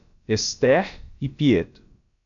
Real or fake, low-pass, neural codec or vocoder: fake; 7.2 kHz; codec, 16 kHz, about 1 kbps, DyCAST, with the encoder's durations